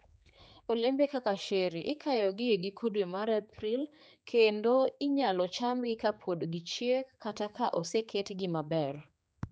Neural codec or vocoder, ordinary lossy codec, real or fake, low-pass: codec, 16 kHz, 4 kbps, X-Codec, HuBERT features, trained on general audio; none; fake; none